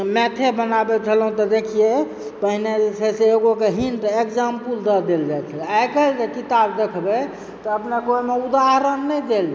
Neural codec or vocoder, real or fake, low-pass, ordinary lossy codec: none; real; none; none